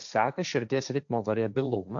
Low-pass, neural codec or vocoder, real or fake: 7.2 kHz; codec, 16 kHz, 1.1 kbps, Voila-Tokenizer; fake